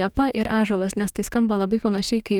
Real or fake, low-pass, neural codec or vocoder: fake; 19.8 kHz; codec, 44.1 kHz, 2.6 kbps, DAC